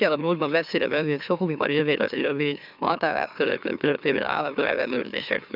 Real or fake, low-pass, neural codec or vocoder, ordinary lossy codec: fake; 5.4 kHz; autoencoder, 44.1 kHz, a latent of 192 numbers a frame, MeloTTS; none